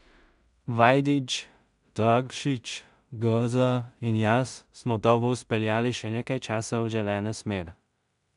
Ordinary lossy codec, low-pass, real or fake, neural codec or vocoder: none; 10.8 kHz; fake; codec, 16 kHz in and 24 kHz out, 0.4 kbps, LongCat-Audio-Codec, two codebook decoder